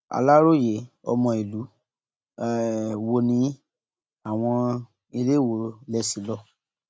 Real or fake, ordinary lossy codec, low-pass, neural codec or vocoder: real; none; none; none